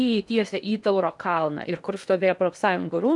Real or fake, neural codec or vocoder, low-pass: fake; codec, 16 kHz in and 24 kHz out, 0.8 kbps, FocalCodec, streaming, 65536 codes; 10.8 kHz